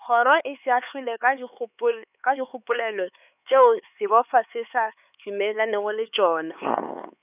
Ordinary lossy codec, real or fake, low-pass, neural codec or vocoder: none; fake; 3.6 kHz; codec, 16 kHz, 4 kbps, X-Codec, HuBERT features, trained on LibriSpeech